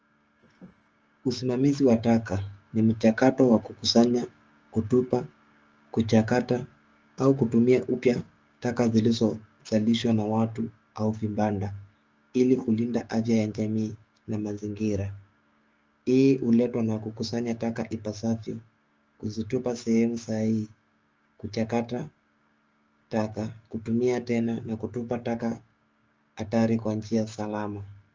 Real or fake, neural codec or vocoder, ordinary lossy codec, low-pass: fake; codec, 16 kHz, 6 kbps, DAC; Opus, 24 kbps; 7.2 kHz